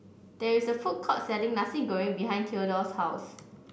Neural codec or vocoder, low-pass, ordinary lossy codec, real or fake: none; none; none; real